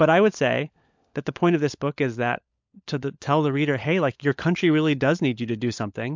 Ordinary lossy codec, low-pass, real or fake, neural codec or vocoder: MP3, 64 kbps; 7.2 kHz; fake; codec, 16 kHz, 16 kbps, FunCodec, trained on LibriTTS, 50 frames a second